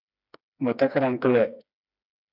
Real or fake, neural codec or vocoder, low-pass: fake; codec, 16 kHz, 2 kbps, FreqCodec, smaller model; 5.4 kHz